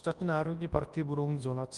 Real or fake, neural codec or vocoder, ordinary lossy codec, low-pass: fake; codec, 24 kHz, 0.9 kbps, WavTokenizer, large speech release; Opus, 32 kbps; 10.8 kHz